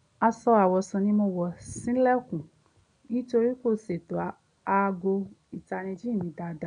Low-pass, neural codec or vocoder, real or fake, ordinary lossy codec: 9.9 kHz; none; real; none